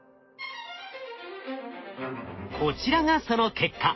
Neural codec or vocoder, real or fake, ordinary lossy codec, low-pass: none; real; MP3, 24 kbps; 7.2 kHz